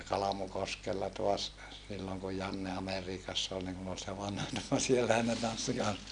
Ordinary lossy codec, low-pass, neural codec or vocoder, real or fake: none; 9.9 kHz; none; real